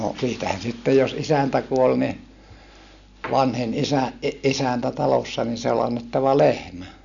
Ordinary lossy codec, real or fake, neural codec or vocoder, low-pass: none; real; none; 7.2 kHz